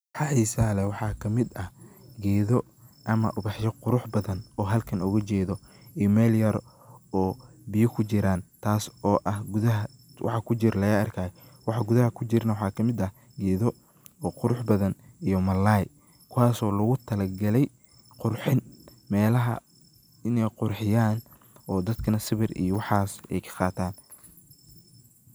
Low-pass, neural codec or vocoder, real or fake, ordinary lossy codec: none; none; real; none